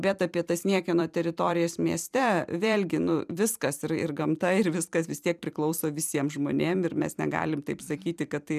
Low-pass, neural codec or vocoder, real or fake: 14.4 kHz; vocoder, 44.1 kHz, 128 mel bands every 256 samples, BigVGAN v2; fake